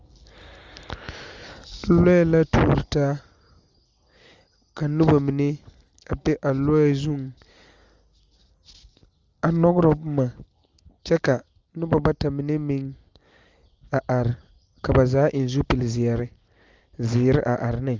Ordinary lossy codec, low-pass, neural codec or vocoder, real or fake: Opus, 32 kbps; 7.2 kHz; none; real